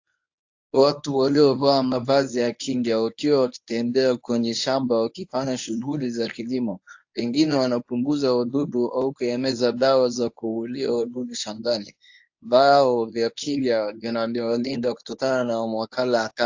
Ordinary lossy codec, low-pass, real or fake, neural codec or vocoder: AAC, 48 kbps; 7.2 kHz; fake; codec, 24 kHz, 0.9 kbps, WavTokenizer, medium speech release version 1